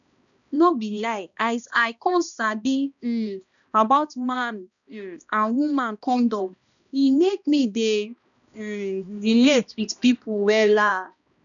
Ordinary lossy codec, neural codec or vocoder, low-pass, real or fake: none; codec, 16 kHz, 1 kbps, X-Codec, HuBERT features, trained on balanced general audio; 7.2 kHz; fake